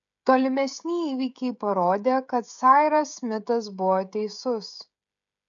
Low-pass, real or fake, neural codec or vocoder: 7.2 kHz; fake; codec, 16 kHz, 16 kbps, FreqCodec, smaller model